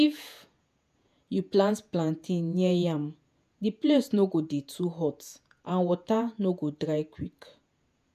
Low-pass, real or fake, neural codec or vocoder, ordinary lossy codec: 14.4 kHz; fake; vocoder, 48 kHz, 128 mel bands, Vocos; none